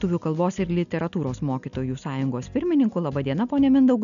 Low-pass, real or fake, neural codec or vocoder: 7.2 kHz; real; none